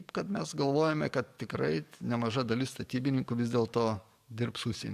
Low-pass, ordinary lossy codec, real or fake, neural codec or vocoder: 14.4 kHz; AAC, 96 kbps; fake; codec, 44.1 kHz, 7.8 kbps, DAC